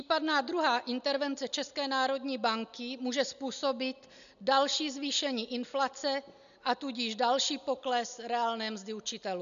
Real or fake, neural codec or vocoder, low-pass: real; none; 7.2 kHz